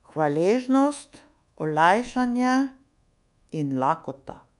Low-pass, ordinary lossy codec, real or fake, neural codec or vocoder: 10.8 kHz; none; fake; codec, 24 kHz, 1.2 kbps, DualCodec